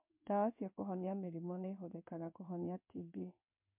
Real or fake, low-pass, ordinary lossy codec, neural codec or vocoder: fake; 3.6 kHz; MP3, 32 kbps; codec, 16 kHz in and 24 kHz out, 1 kbps, XY-Tokenizer